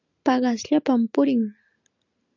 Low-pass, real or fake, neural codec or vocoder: 7.2 kHz; real; none